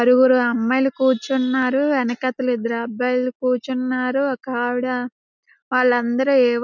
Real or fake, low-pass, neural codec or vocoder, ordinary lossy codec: real; 7.2 kHz; none; none